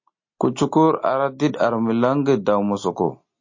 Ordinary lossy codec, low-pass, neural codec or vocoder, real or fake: MP3, 32 kbps; 7.2 kHz; none; real